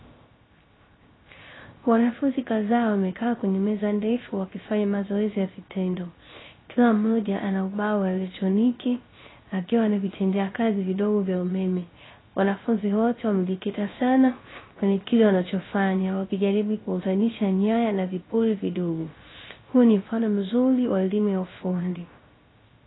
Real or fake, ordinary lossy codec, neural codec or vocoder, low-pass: fake; AAC, 16 kbps; codec, 16 kHz, 0.3 kbps, FocalCodec; 7.2 kHz